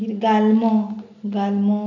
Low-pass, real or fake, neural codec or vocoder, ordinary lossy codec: 7.2 kHz; real; none; none